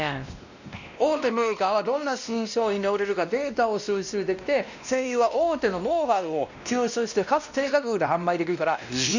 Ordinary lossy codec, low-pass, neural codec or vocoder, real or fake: none; 7.2 kHz; codec, 16 kHz, 1 kbps, X-Codec, WavLM features, trained on Multilingual LibriSpeech; fake